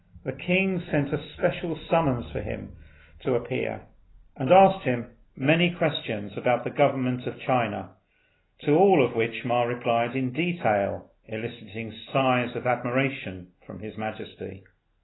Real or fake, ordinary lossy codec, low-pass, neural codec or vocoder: real; AAC, 16 kbps; 7.2 kHz; none